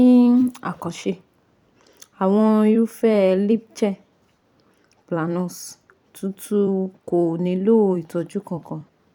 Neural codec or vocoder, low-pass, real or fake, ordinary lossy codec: vocoder, 44.1 kHz, 128 mel bands every 256 samples, BigVGAN v2; 19.8 kHz; fake; none